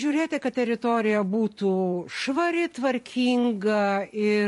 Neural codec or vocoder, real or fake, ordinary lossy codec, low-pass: vocoder, 44.1 kHz, 128 mel bands every 512 samples, BigVGAN v2; fake; MP3, 48 kbps; 14.4 kHz